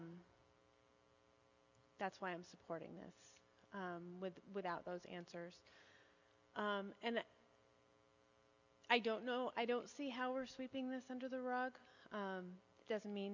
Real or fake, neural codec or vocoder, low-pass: real; none; 7.2 kHz